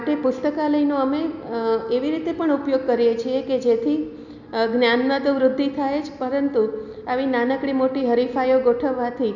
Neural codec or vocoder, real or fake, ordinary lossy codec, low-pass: none; real; none; 7.2 kHz